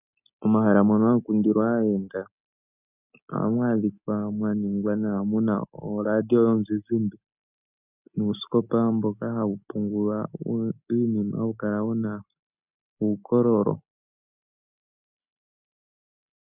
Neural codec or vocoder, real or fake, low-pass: none; real; 3.6 kHz